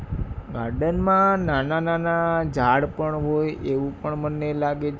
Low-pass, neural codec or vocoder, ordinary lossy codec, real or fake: none; none; none; real